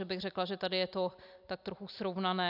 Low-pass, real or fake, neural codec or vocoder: 5.4 kHz; real; none